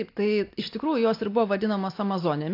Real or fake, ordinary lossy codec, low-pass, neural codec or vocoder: real; AAC, 32 kbps; 5.4 kHz; none